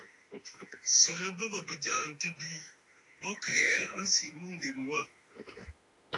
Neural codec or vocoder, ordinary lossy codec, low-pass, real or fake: autoencoder, 48 kHz, 32 numbers a frame, DAC-VAE, trained on Japanese speech; AAC, 48 kbps; 10.8 kHz; fake